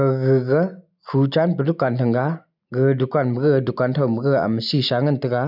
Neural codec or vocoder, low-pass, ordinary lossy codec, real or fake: none; 5.4 kHz; none; real